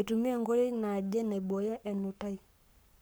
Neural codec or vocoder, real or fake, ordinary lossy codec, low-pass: codec, 44.1 kHz, 7.8 kbps, Pupu-Codec; fake; none; none